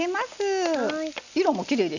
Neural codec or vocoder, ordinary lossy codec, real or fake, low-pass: none; none; real; 7.2 kHz